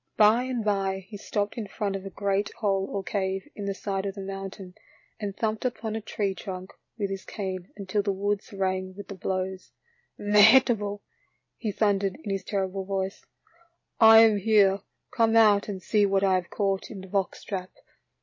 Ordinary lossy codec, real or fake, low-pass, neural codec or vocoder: MP3, 32 kbps; real; 7.2 kHz; none